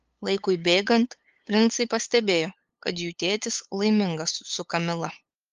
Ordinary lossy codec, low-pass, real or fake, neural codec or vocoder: Opus, 32 kbps; 7.2 kHz; fake; codec, 16 kHz, 8 kbps, FunCodec, trained on LibriTTS, 25 frames a second